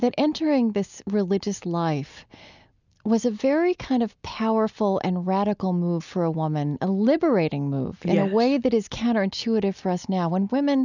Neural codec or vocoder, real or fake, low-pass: none; real; 7.2 kHz